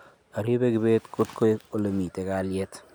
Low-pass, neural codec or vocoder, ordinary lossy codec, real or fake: none; none; none; real